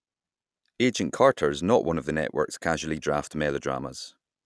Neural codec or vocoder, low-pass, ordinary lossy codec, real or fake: none; none; none; real